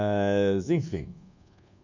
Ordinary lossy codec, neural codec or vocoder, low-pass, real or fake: none; codec, 24 kHz, 1.2 kbps, DualCodec; 7.2 kHz; fake